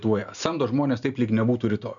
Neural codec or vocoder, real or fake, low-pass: none; real; 7.2 kHz